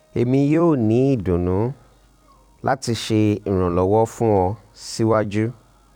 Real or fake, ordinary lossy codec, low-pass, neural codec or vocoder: fake; none; 19.8 kHz; vocoder, 44.1 kHz, 128 mel bands every 256 samples, BigVGAN v2